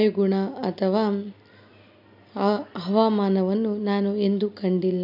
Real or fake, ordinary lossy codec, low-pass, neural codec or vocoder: real; none; 5.4 kHz; none